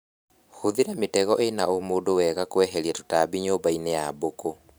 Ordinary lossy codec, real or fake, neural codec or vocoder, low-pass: none; fake; vocoder, 44.1 kHz, 128 mel bands every 512 samples, BigVGAN v2; none